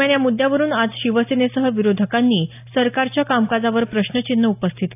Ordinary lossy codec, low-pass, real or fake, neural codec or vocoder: AAC, 32 kbps; 3.6 kHz; real; none